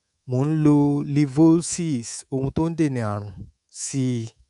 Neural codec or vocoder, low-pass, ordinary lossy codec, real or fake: codec, 24 kHz, 3.1 kbps, DualCodec; 10.8 kHz; none; fake